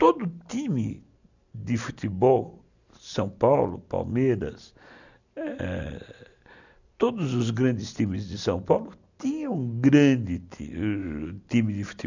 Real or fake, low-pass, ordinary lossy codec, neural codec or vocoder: real; 7.2 kHz; none; none